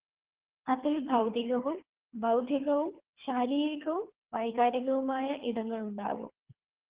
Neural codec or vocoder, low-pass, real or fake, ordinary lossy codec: codec, 24 kHz, 3 kbps, HILCodec; 3.6 kHz; fake; Opus, 16 kbps